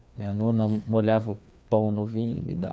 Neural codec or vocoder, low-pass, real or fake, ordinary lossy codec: codec, 16 kHz, 2 kbps, FreqCodec, larger model; none; fake; none